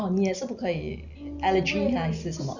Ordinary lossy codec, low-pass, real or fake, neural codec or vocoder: none; 7.2 kHz; real; none